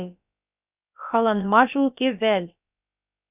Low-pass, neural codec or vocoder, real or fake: 3.6 kHz; codec, 16 kHz, about 1 kbps, DyCAST, with the encoder's durations; fake